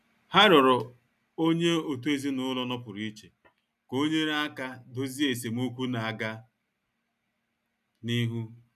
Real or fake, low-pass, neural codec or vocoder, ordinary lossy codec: real; 14.4 kHz; none; none